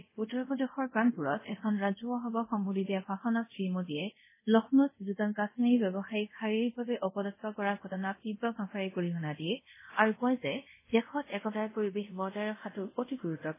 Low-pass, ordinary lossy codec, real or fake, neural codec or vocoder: 3.6 kHz; MP3, 16 kbps; fake; codec, 24 kHz, 0.5 kbps, DualCodec